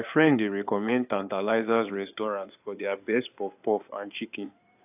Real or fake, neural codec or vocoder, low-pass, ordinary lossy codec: fake; codec, 16 kHz in and 24 kHz out, 2.2 kbps, FireRedTTS-2 codec; 3.6 kHz; AAC, 32 kbps